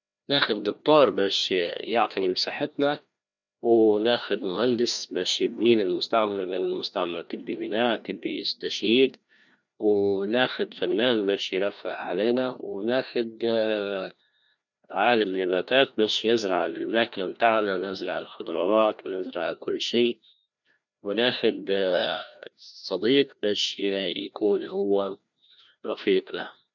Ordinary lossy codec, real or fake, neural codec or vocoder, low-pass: none; fake; codec, 16 kHz, 1 kbps, FreqCodec, larger model; 7.2 kHz